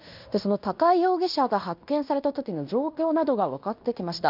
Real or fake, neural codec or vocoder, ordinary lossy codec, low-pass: fake; codec, 16 kHz in and 24 kHz out, 0.9 kbps, LongCat-Audio-Codec, fine tuned four codebook decoder; none; 5.4 kHz